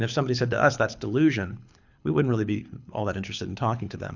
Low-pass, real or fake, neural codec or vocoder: 7.2 kHz; fake; codec, 24 kHz, 6 kbps, HILCodec